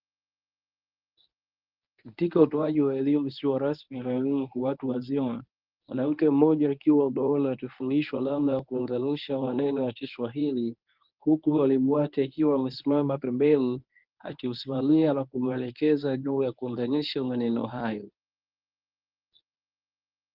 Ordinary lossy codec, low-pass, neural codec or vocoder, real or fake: Opus, 32 kbps; 5.4 kHz; codec, 24 kHz, 0.9 kbps, WavTokenizer, medium speech release version 1; fake